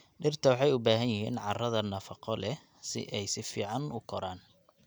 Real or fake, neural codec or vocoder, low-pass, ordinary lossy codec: real; none; none; none